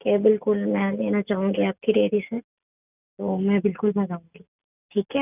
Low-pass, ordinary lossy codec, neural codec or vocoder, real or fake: 3.6 kHz; none; none; real